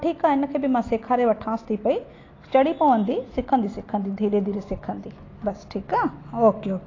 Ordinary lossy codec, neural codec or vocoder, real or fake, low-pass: AAC, 32 kbps; none; real; 7.2 kHz